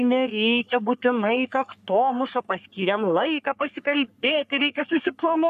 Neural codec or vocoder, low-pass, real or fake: codec, 44.1 kHz, 3.4 kbps, Pupu-Codec; 14.4 kHz; fake